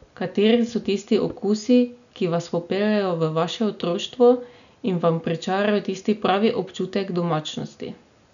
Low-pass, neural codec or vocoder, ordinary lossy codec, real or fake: 7.2 kHz; none; none; real